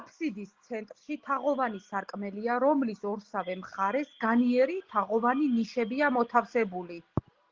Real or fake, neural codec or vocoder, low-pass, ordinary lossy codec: real; none; 7.2 kHz; Opus, 16 kbps